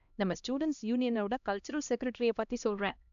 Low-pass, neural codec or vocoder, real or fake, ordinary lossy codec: 7.2 kHz; codec, 16 kHz, 1 kbps, X-Codec, HuBERT features, trained on LibriSpeech; fake; none